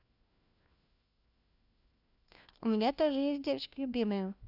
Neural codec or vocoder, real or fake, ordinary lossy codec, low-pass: codec, 16 kHz, 1 kbps, FunCodec, trained on LibriTTS, 50 frames a second; fake; none; 5.4 kHz